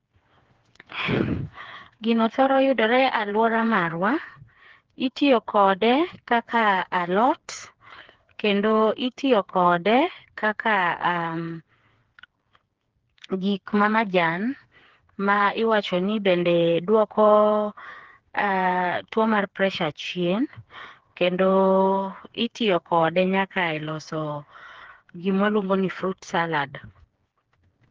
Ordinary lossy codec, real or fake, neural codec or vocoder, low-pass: Opus, 16 kbps; fake; codec, 16 kHz, 4 kbps, FreqCodec, smaller model; 7.2 kHz